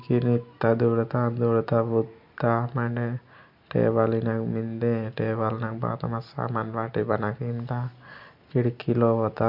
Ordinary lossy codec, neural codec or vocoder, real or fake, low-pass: MP3, 48 kbps; none; real; 5.4 kHz